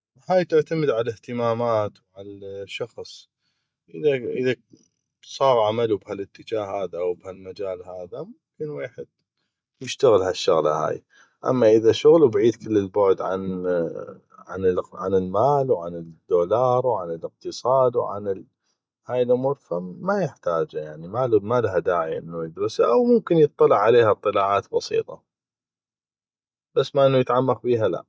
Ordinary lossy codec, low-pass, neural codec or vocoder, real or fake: none; none; none; real